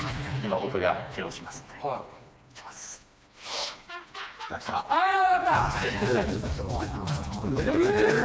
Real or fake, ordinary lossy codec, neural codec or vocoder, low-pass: fake; none; codec, 16 kHz, 2 kbps, FreqCodec, smaller model; none